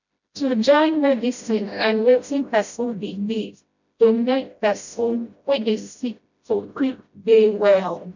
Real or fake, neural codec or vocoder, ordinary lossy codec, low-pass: fake; codec, 16 kHz, 0.5 kbps, FreqCodec, smaller model; none; 7.2 kHz